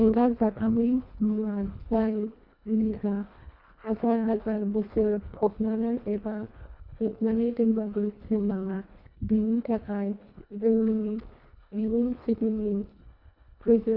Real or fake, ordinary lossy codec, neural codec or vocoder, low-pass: fake; none; codec, 24 kHz, 1.5 kbps, HILCodec; 5.4 kHz